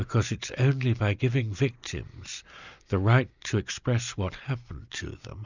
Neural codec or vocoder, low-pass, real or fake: none; 7.2 kHz; real